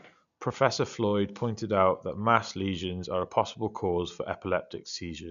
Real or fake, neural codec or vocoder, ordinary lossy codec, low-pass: real; none; none; 7.2 kHz